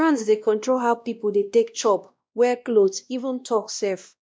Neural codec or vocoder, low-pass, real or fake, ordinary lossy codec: codec, 16 kHz, 1 kbps, X-Codec, WavLM features, trained on Multilingual LibriSpeech; none; fake; none